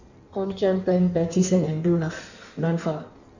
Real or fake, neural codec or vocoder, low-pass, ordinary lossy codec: fake; codec, 16 kHz in and 24 kHz out, 1.1 kbps, FireRedTTS-2 codec; 7.2 kHz; none